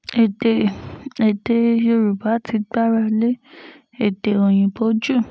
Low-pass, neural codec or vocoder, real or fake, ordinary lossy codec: none; none; real; none